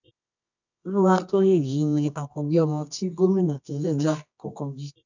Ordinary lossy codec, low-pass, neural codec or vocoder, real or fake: none; 7.2 kHz; codec, 24 kHz, 0.9 kbps, WavTokenizer, medium music audio release; fake